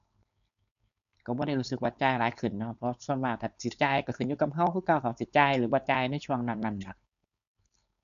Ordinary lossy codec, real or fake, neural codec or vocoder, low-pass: none; fake; codec, 16 kHz, 4.8 kbps, FACodec; 7.2 kHz